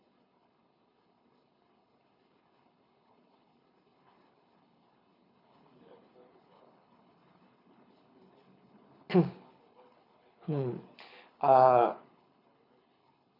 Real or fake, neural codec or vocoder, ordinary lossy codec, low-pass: fake; codec, 24 kHz, 6 kbps, HILCodec; none; 5.4 kHz